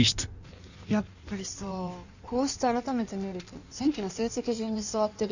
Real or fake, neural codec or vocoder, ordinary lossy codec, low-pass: fake; codec, 16 kHz in and 24 kHz out, 1.1 kbps, FireRedTTS-2 codec; none; 7.2 kHz